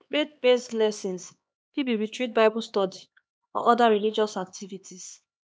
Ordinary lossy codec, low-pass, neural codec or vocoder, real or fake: none; none; codec, 16 kHz, 2 kbps, X-Codec, HuBERT features, trained on LibriSpeech; fake